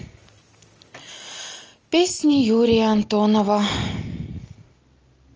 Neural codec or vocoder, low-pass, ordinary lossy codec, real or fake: none; 7.2 kHz; Opus, 24 kbps; real